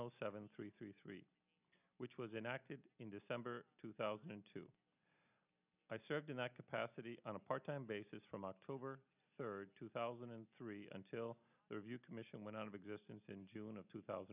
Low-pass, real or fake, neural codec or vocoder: 3.6 kHz; real; none